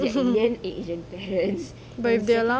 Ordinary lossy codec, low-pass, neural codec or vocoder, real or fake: none; none; none; real